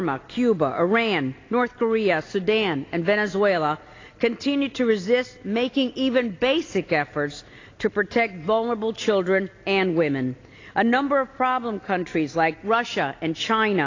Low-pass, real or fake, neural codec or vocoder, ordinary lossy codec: 7.2 kHz; real; none; AAC, 32 kbps